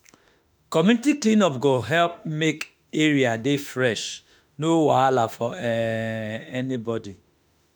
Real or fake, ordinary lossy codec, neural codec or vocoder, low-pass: fake; none; autoencoder, 48 kHz, 32 numbers a frame, DAC-VAE, trained on Japanese speech; none